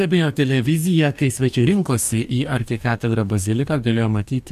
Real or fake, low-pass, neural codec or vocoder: fake; 14.4 kHz; codec, 44.1 kHz, 2.6 kbps, DAC